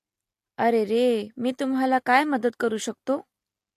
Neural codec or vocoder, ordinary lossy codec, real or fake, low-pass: none; AAC, 64 kbps; real; 14.4 kHz